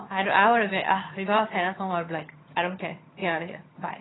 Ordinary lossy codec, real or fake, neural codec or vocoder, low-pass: AAC, 16 kbps; fake; vocoder, 22.05 kHz, 80 mel bands, HiFi-GAN; 7.2 kHz